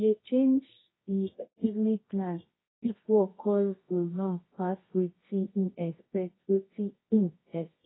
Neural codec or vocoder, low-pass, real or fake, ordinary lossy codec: codec, 24 kHz, 0.9 kbps, WavTokenizer, medium music audio release; 7.2 kHz; fake; AAC, 16 kbps